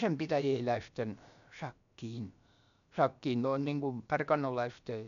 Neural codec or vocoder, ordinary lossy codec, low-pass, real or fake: codec, 16 kHz, about 1 kbps, DyCAST, with the encoder's durations; none; 7.2 kHz; fake